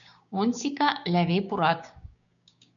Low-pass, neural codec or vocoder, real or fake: 7.2 kHz; codec, 16 kHz, 6 kbps, DAC; fake